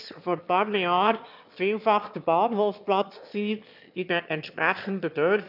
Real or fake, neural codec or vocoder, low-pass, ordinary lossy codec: fake; autoencoder, 22.05 kHz, a latent of 192 numbers a frame, VITS, trained on one speaker; 5.4 kHz; none